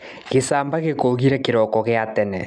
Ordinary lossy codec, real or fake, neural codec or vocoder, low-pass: none; real; none; none